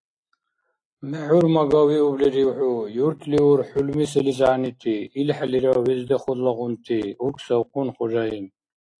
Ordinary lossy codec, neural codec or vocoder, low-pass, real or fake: AAC, 48 kbps; none; 9.9 kHz; real